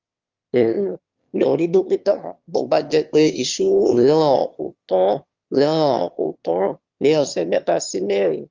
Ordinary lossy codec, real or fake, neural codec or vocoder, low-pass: Opus, 32 kbps; fake; autoencoder, 22.05 kHz, a latent of 192 numbers a frame, VITS, trained on one speaker; 7.2 kHz